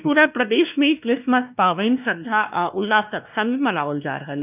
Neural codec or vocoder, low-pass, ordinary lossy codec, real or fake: codec, 16 kHz, 1 kbps, X-Codec, WavLM features, trained on Multilingual LibriSpeech; 3.6 kHz; none; fake